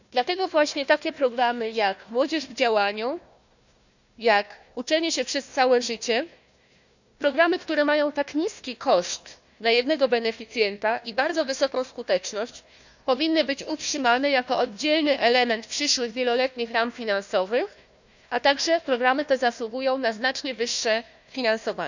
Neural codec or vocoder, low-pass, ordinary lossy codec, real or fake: codec, 16 kHz, 1 kbps, FunCodec, trained on Chinese and English, 50 frames a second; 7.2 kHz; none; fake